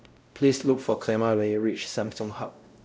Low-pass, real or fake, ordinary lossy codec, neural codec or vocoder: none; fake; none; codec, 16 kHz, 0.5 kbps, X-Codec, WavLM features, trained on Multilingual LibriSpeech